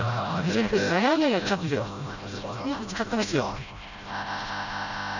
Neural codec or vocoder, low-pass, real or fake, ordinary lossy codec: codec, 16 kHz, 0.5 kbps, FreqCodec, smaller model; 7.2 kHz; fake; none